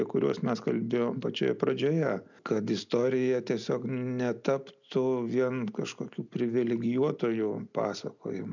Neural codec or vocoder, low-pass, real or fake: none; 7.2 kHz; real